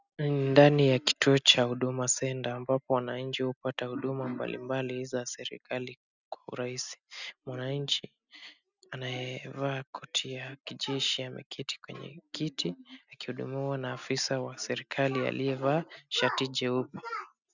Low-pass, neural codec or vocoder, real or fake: 7.2 kHz; none; real